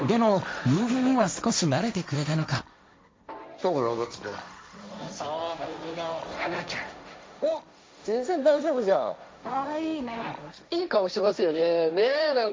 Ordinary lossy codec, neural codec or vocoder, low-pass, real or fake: none; codec, 16 kHz, 1.1 kbps, Voila-Tokenizer; none; fake